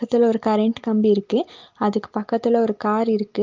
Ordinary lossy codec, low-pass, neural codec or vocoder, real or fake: Opus, 32 kbps; 7.2 kHz; none; real